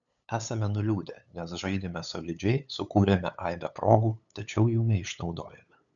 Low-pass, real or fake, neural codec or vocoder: 7.2 kHz; fake; codec, 16 kHz, 8 kbps, FunCodec, trained on LibriTTS, 25 frames a second